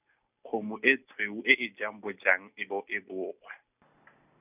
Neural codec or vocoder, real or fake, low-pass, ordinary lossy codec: none; real; 3.6 kHz; none